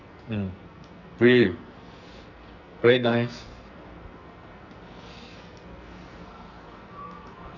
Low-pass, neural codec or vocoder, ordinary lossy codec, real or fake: 7.2 kHz; codec, 44.1 kHz, 2.6 kbps, SNAC; none; fake